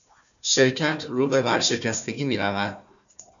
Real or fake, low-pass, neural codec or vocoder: fake; 7.2 kHz; codec, 16 kHz, 1 kbps, FunCodec, trained on Chinese and English, 50 frames a second